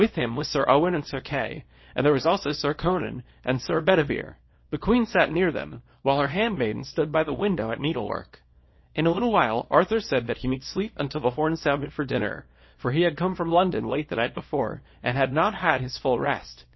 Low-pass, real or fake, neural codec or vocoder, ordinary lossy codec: 7.2 kHz; fake; codec, 24 kHz, 0.9 kbps, WavTokenizer, small release; MP3, 24 kbps